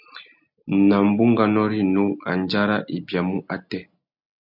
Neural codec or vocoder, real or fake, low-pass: none; real; 5.4 kHz